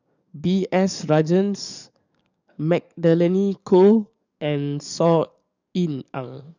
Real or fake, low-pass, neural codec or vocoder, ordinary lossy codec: fake; 7.2 kHz; codec, 44.1 kHz, 7.8 kbps, DAC; none